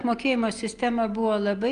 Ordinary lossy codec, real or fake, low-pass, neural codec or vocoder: Opus, 32 kbps; real; 9.9 kHz; none